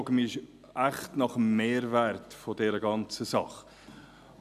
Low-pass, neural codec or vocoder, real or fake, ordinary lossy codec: 14.4 kHz; none; real; none